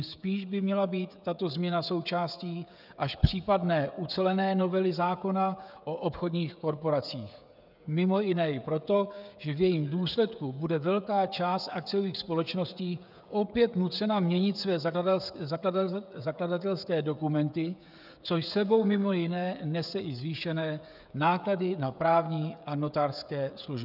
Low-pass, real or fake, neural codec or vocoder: 5.4 kHz; fake; codec, 16 kHz, 16 kbps, FreqCodec, smaller model